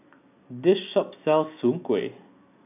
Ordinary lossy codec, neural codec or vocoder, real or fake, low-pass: none; none; real; 3.6 kHz